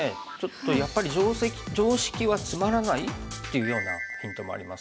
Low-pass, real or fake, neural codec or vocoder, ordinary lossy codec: none; real; none; none